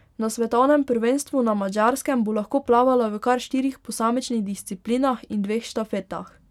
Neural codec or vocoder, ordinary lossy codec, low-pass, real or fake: none; none; 19.8 kHz; real